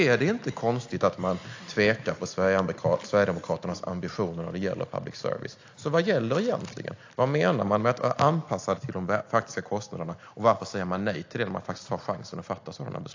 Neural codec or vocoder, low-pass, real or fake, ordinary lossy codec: none; 7.2 kHz; real; AAC, 48 kbps